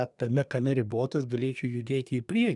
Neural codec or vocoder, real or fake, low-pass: codec, 24 kHz, 1 kbps, SNAC; fake; 10.8 kHz